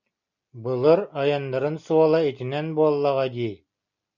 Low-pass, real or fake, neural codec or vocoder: 7.2 kHz; real; none